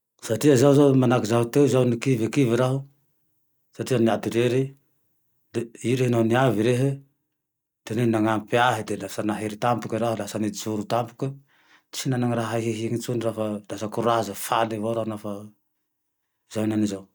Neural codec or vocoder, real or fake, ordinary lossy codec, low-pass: none; real; none; none